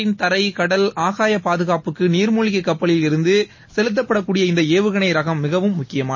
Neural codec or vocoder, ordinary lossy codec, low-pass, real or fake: none; MP3, 32 kbps; 7.2 kHz; real